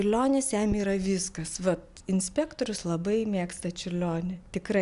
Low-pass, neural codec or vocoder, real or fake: 10.8 kHz; none; real